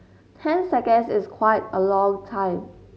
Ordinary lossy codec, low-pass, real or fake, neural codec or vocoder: none; none; real; none